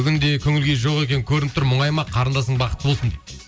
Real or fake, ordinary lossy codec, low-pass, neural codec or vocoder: real; none; none; none